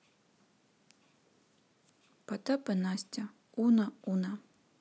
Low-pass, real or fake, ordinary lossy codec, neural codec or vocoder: none; real; none; none